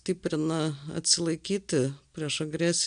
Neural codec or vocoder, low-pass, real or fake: none; 9.9 kHz; real